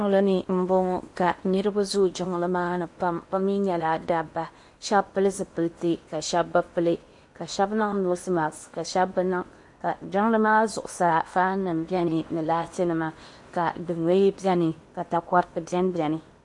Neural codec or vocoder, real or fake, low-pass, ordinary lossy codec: codec, 16 kHz in and 24 kHz out, 0.8 kbps, FocalCodec, streaming, 65536 codes; fake; 10.8 kHz; MP3, 48 kbps